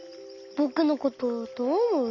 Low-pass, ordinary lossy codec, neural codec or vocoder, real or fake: 7.2 kHz; none; none; real